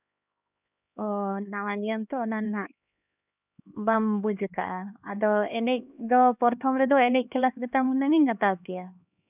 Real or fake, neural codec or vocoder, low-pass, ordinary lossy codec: fake; codec, 16 kHz, 2 kbps, X-Codec, HuBERT features, trained on LibriSpeech; 3.6 kHz; none